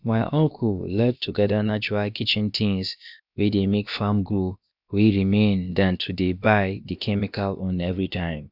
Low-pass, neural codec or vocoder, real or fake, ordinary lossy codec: 5.4 kHz; codec, 16 kHz, about 1 kbps, DyCAST, with the encoder's durations; fake; none